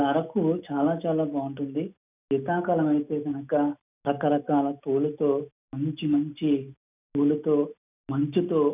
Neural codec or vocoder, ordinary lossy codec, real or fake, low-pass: none; none; real; 3.6 kHz